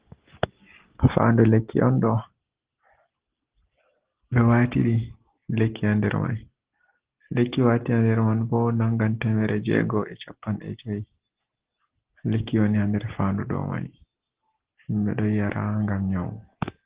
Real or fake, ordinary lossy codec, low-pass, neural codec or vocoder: real; Opus, 16 kbps; 3.6 kHz; none